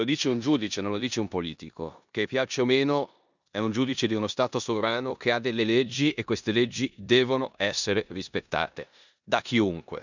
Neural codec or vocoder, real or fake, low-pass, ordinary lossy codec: codec, 16 kHz in and 24 kHz out, 0.9 kbps, LongCat-Audio-Codec, four codebook decoder; fake; 7.2 kHz; none